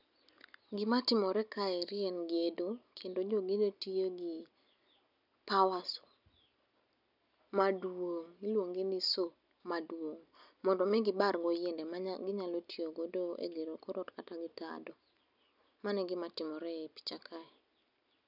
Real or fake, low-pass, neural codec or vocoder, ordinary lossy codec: real; 5.4 kHz; none; none